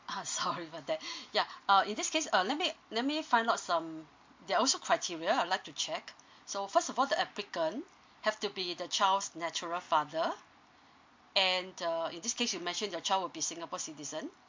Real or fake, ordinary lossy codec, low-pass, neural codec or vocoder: real; MP3, 48 kbps; 7.2 kHz; none